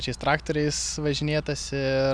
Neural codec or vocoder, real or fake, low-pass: none; real; 9.9 kHz